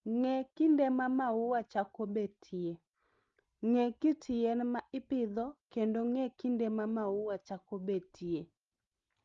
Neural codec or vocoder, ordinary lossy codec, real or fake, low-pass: none; Opus, 32 kbps; real; 7.2 kHz